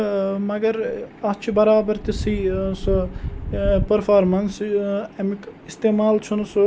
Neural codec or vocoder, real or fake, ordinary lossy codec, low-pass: none; real; none; none